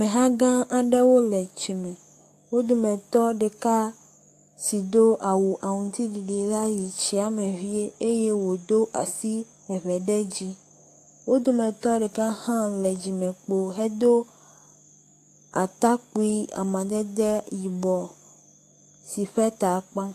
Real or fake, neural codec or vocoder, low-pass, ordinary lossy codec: fake; codec, 44.1 kHz, 7.8 kbps, DAC; 14.4 kHz; AAC, 64 kbps